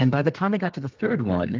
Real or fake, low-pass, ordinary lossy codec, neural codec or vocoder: fake; 7.2 kHz; Opus, 24 kbps; codec, 32 kHz, 1.9 kbps, SNAC